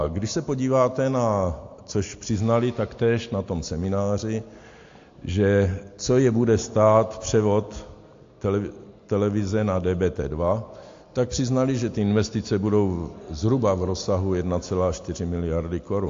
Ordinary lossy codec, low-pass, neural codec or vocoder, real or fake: AAC, 48 kbps; 7.2 kHz; none; real